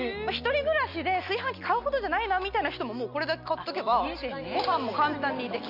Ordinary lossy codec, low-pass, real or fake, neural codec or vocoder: none; 5.4 kHz; real; none